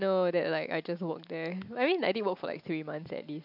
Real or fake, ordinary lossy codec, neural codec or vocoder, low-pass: real; none; none; 5.4 kHz